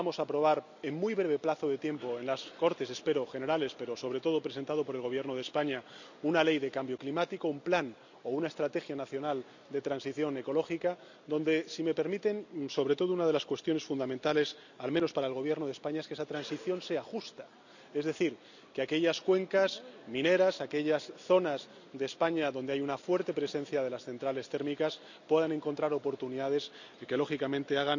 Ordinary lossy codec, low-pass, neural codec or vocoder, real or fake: none; 7.2 kHz; none; real